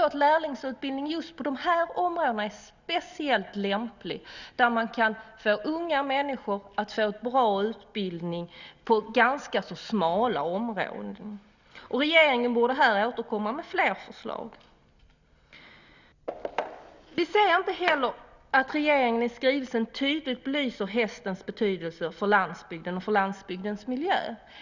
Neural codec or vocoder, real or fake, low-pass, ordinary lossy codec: none; real; 7.2 kHz; none